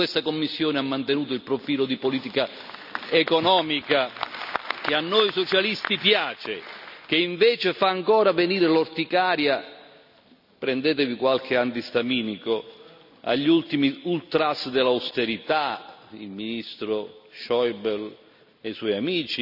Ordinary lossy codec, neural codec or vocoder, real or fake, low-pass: none; none; real; 5.4 kHz